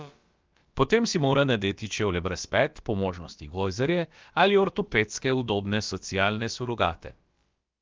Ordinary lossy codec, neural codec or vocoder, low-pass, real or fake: Opus, 32 kbps; codec, 16 kHz, about 1 kbps, DyCAST, with the encoder's durations; 7.2 kHz; fake